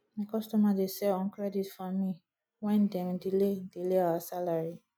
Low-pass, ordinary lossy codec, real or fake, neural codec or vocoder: none; none; real; none